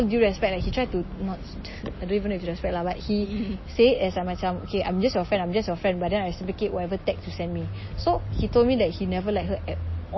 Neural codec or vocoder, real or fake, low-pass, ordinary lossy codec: none; real; 7.2 kHz; MP3, 24 kbps